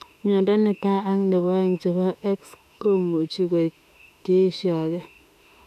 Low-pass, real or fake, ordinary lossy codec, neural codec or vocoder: 14.4 kHz; fake; none; autoencoder, 48 kHz, 32 numbers a frame, DAC-VAE, trained on Japanese speech